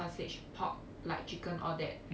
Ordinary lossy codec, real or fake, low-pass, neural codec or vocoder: none; real; none; none